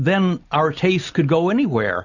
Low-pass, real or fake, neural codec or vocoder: 7.2 kHz; real; none